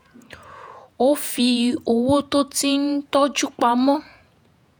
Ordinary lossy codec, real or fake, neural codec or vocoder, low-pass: none; fake; vocoder, 48 kHz, 128 mel bands, Vocos; none